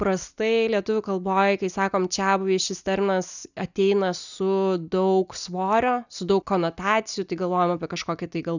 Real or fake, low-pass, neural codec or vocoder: real; 7.2 kHz; none